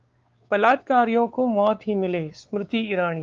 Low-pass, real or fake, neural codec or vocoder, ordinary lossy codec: 7.2 kHz; fake; codec, 16 kHz, 4 kbps, X-Codec, WavLM features, trained on Multilingual LibriSpeech; Opus, 32 kbps